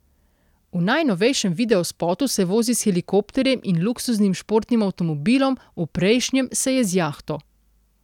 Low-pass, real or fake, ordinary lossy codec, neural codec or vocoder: 19.8 kHz; real; none; none